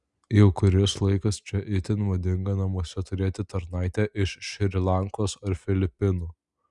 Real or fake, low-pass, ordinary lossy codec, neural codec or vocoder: real; 10.8 kHz; Opus, 64 kbps; none